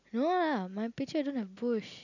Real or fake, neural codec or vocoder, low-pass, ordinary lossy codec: real; none; 7.2 kHz; none